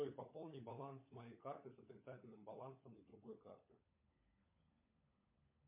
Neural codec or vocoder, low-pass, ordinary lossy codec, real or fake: codec, 16 kHz, 16 kbps, FunCodec, trained on LibriTTS, 50 frames a second; 3.6 kHz; MP3, 24 kbps; fake